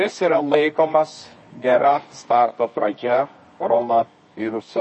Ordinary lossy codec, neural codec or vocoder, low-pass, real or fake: MP3, 32 kbps; codec, 24 kHz, 0.9 kbps, WavTokenizer, medium music audio release; 10.8 kHz; fake